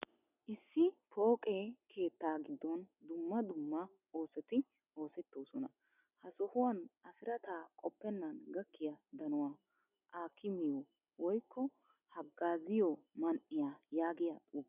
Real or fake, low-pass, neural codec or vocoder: real; 3.6 kHz; none